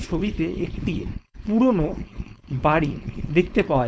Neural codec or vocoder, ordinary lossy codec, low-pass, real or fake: codec, 16 kHz, 4.8 kbps, FACodec; none; none; fake